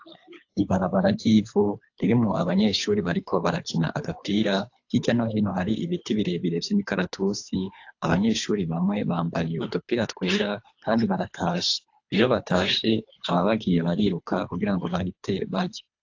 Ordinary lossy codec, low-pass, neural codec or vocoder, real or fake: AAC, 48 kbps; 7.2 kHz; codec, 24 kHz, 3 kbps, HILCodec; fake